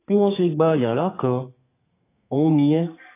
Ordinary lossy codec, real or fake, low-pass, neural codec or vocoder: AAC, 24 kbps; fake; 3.6 kHz; codec, 32 kHz, 1.9 kbps, SNAC